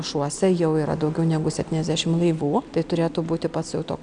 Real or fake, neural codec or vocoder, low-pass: real; none; 9.9 kHz